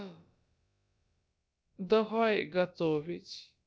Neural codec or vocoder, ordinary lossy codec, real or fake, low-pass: codec, 16 kHz, about 1 kbps, DyCAST, with the encoder's durations; none; fake; none